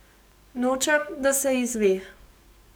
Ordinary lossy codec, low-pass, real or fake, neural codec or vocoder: none; none; fake; codec, 44.1 kHz, 7.8 kbps, DAC